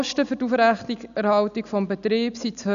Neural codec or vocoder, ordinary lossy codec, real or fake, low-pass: none; none; real; 7.2 kHz